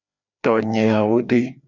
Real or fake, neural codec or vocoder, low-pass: fake; codec, 16 kHz, 2 kbps, FreqCodec, larger model; 7.2 kHz